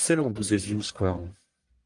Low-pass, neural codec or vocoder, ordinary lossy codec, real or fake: 10.8 kHz; codec, 44.1 kHz, 1.7 kbps, Pupu-Codec; Opus, 32 kbps; fake